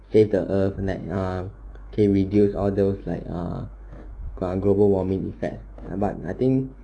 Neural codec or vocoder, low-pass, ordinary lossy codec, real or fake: autoencoder, 48 kHz, 128 numbers a frame, DAC-VAE, trained on Japanese speech; 9.9 kHz; none; fake